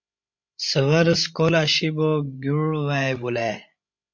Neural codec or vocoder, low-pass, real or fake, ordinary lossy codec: codec, 16 kHz, 16 kbps, FreqCodec, larger model; 7.2 kHz; fake; MP3, 48 kbps